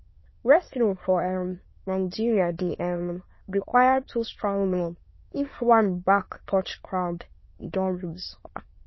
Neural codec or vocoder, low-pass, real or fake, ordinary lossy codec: autoencoder, 22.05 kHz, a latent of 192 numbers a frame, VITS, trained on many speakers; 7.2 kHz; fake; MP3, 24 kbps